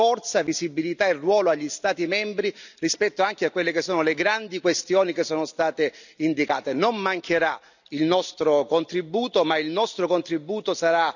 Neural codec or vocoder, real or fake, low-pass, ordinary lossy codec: none; real; 7.2 kHz; none